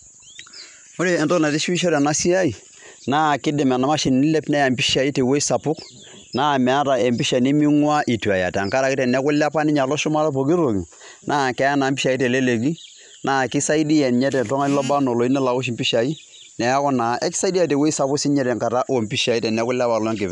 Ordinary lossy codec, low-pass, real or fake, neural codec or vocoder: MP3, 96 kbps; 10.8 kHz; real; none